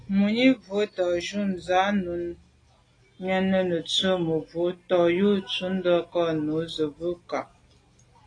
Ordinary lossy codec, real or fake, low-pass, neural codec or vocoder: AAC, 32 kbps; real; 9.9 kHz; none